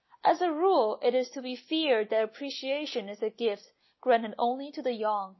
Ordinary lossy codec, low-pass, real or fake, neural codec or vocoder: MP3, 24 kbps; 7.2 kHz; real; none